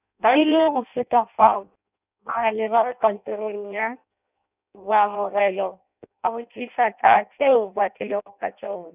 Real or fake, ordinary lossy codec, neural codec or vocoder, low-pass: fake; none; codec, 16 kHz in and 24 kHz out, 0.6 kbps, FireRedTTS-2 codec; 3.6 kHz